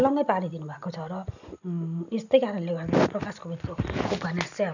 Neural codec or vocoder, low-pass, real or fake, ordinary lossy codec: none; 7.2 kHz; real; none